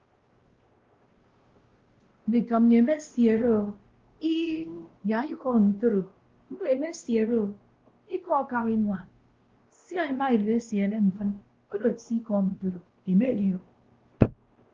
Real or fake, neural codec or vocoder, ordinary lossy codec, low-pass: fake; codec, 16 kHz, 1 kbps, X-Codec, WavLM features, trained on Multilingual LibriSpeech; Opus, 16 kbps; 7.2 kHz